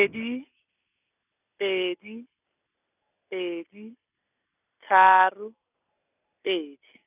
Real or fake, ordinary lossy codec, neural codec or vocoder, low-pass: real; none; none; 3.6 kHz